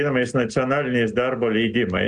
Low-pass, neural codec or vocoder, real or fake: 10.8 kHz; none; real